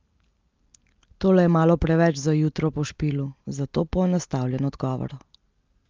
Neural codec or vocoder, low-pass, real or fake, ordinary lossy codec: none; 7.2 kHz; real; Opus, 24 kbps